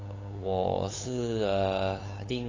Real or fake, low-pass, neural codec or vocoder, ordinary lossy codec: fake; 7.2 kHz; codec, 16 kHz, 8 kbps, FunCodec, trained on LibriTTS, 25 frames a second; AAC, 32 kbps